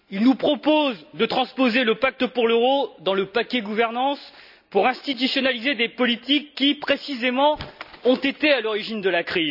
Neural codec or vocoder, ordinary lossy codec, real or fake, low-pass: none; none; real; 5.4 kHz